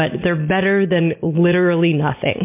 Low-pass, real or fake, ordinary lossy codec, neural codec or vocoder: 3.6 kHz; real; MP3, 24 kbps; none